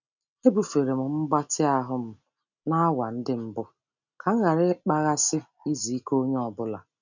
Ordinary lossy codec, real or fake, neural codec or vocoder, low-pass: none; real; none; 7.2 kHz